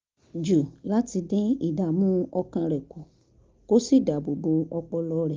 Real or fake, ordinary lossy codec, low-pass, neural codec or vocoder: real; Opus, 32 kbps; 7.2 kHz; none